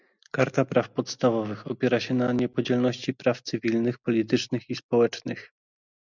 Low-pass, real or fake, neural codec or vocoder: 7.2 kHz; real; none